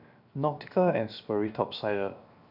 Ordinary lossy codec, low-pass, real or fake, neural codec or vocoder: none; 5.4 kHz; fake; codec, 16 kHz, 0.7 kbps, FocalCodec